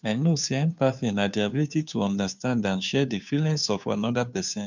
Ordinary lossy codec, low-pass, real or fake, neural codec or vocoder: none; 7.2 kHz; fake; codec, 16 kHz, 2 kbps, FunCodec, trained on Chinese and English, 25 frames a second